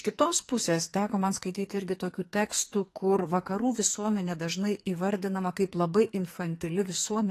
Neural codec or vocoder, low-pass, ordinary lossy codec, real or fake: codec, 44.1 kHz, 2.6 kbps, SNAC; 14.4 kHz; AAC, 48 kbps; fake